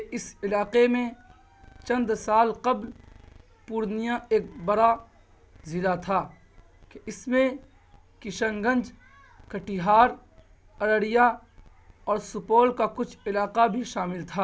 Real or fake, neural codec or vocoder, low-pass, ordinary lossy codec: real; none; none; none